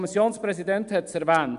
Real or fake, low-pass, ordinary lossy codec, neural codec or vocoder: real; 10.8 kHz; none; none